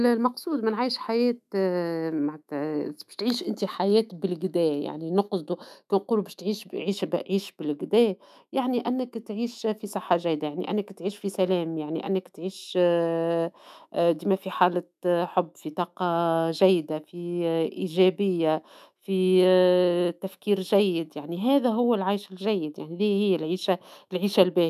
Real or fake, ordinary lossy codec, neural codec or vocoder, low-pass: fake; none; autoencoder, 48 kHz, 128 numbers a frame, DAC-VAE, trained on Japanese speech; 14.4 kHz